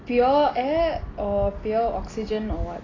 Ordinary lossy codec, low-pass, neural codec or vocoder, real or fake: AAC, 32 kbps; 7.2 kHz; none; real